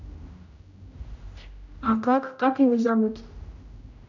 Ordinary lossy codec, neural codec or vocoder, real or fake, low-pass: none; codec, 16 kHz, 0.5 kbps, X-Codec, HuBERT features, trained on general audio; fake; 7.2 kHz